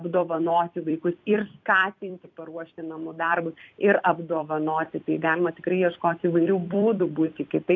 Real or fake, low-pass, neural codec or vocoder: fake; 7.2 kHz; vocoder, 44.1 kHz, 128 mel bands every 256 samples, BigVGAN v2